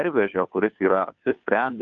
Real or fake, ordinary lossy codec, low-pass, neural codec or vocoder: fake; MP3, 64 kbps; 7.2 kHz; codec, 16 kHz, 2 kbps, FunCodec, trained on Chinese and English, 25 frames a second